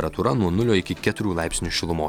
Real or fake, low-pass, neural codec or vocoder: real; 19.8 kHz; none